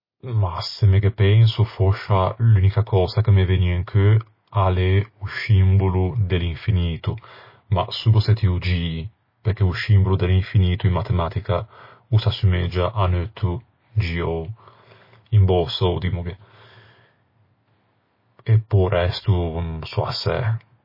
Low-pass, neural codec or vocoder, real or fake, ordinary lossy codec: 5.4 kHz; none; real; MP3, 24 kbps